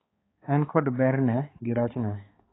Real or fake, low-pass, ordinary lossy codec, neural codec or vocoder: fake; 7.2 kHz; AAC, 16 kbps; codec, 16 kHz, 4 kbps, X-Codec, HuBERT features, trained on balanced general audio